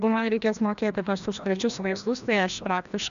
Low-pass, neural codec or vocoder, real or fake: 7.2 kHz; codec, 16 kHz, 1 kbps, FreqCodec, larger model; fake